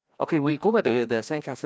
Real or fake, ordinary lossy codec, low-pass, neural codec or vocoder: fake; none; none; codec, 16 kHz, 1 kbps, FreqCodec, larger model